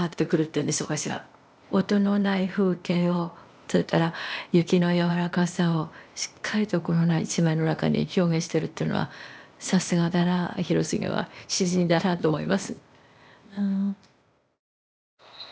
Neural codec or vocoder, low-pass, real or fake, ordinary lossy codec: codec, 16 kHz, 0.8 kbps, ZipCodec; none; fake; none